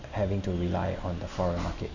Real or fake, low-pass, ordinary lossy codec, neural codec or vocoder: real; 7.2 kHz; none; none